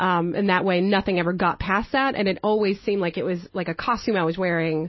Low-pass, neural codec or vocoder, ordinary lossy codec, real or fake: 7.2 kHz; none; MP3, 24 kbps; real